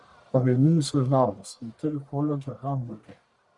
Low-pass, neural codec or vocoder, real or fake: 10.8 kHz; codec, 44.1 kHz, 1.7 kbps, Pupu-Codec; fake